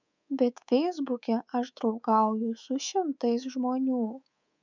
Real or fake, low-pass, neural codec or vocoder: fake; 7.2 kHz; codec, 24 kHz, 3.1 kbps, DualCodec